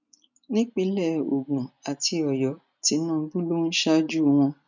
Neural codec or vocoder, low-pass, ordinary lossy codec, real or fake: none; 7.2 kHz; none; real